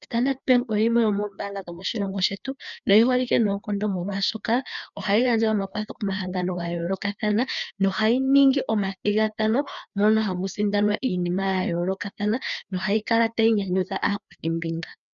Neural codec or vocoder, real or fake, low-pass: codec, 16 kHz, 2 kbps, FreqCodec, larger model; fake; 7.2 kHz